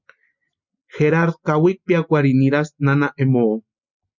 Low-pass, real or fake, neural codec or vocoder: 7.2 kHz; real; none